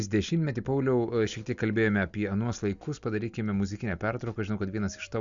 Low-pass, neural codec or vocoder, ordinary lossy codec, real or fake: 7.2 kHz; none; Opus, 64 kbps; real